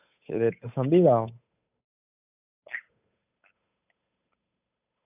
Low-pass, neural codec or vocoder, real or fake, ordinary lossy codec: 3.6 kHz; codec, 16 kHz, 8 kbps, FunCodec, trained on Chinese and English, 25 frames a second; fake; none